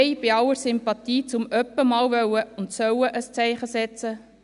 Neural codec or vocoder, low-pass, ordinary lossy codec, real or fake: none; 10.8 kHz; none; real